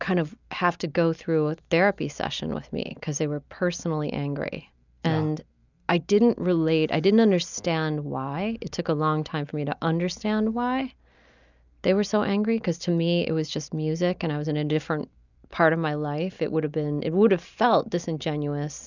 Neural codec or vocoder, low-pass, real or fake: none; 7.2 kHz; real